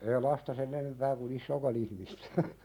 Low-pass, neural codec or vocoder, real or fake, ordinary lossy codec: 19.8 kHz; none; real; none